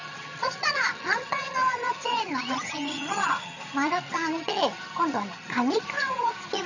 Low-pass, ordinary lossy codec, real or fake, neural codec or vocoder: 7.2 kHz; none; fake; vocoder, 22.05 kHz, 80 mel bands, HiFi-GAN